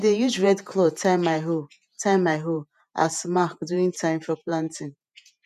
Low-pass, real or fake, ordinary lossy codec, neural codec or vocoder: 14.4 kHz; fake; none; vocoder, 48 kHz, 128 mel bands, Vocos